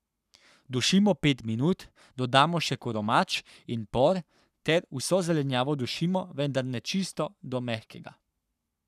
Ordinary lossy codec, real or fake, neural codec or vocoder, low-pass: none; fake; codec, 44.1 kHz, 7.8 kbps, Pupu-Codec; 14.4 kHz